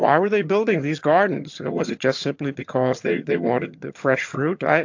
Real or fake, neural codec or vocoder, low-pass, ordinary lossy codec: fake; vocoder, 22.05 kHz, 80 mel bands, HiFi-GAN; 7.2 kHz; AAC, 48 kbps